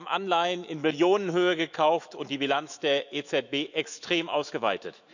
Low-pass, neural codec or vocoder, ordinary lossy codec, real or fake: 7.2 kHz; autoencoder, 48 kHz, 128 numbers a frame, DAC-VAE, trained on Japanese speech; none; fake